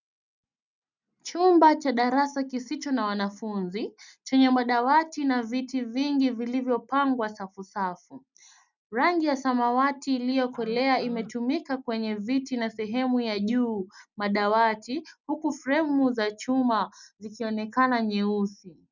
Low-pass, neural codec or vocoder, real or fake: 7.2 kHz; none; real